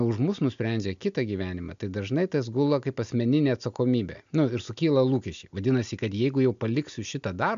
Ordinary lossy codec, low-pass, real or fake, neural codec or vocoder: MP3, 64 kbps; 7.2 kHz; real; none